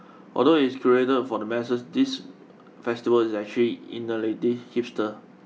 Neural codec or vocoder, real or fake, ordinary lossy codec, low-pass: none; real; none; none